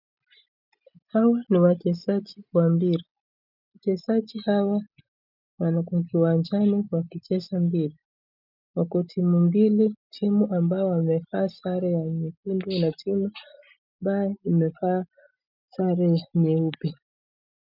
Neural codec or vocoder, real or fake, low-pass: none; real; 5.4 kHz